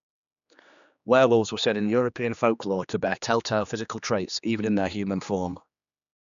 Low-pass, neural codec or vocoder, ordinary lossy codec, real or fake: 7.2 kHz; codec, 16 kHz, 2 kbps, X-Codec, HuBERT features, trained on general audio; none; fake